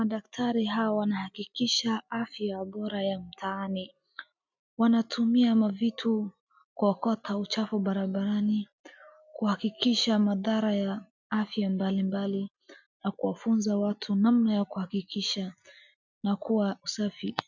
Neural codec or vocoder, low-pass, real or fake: none; 7.2 kHz; real